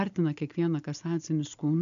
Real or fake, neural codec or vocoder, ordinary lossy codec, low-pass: real; none; MP3, 64 kbps; 7.2 kHz